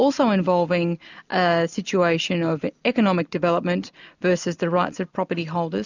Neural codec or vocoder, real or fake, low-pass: none; real; 7.2 kHz